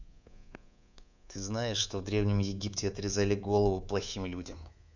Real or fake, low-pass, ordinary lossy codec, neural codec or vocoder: fake; 7.2 kHz; none; codec, 24 kHz, 3.1 kbps, DualCodec